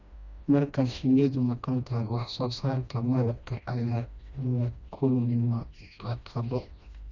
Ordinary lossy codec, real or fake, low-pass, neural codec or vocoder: none; fake; 7.2 kHz; codec, 16 kHz, 1 kbps, FreqCodec, smaller model